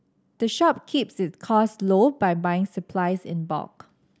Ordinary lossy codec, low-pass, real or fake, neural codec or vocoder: none; none; real; none